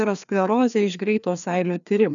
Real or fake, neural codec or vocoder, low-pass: fake; codec, 16 kHz, 2 kbps, FreqCodec, larger model; 7.2 kHz